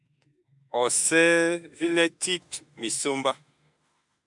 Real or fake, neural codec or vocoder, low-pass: fake; autoencoder, 48 kHz, 32 numbers a frame, DAC-VAE, trained on Japanese speech; 10.8 kHz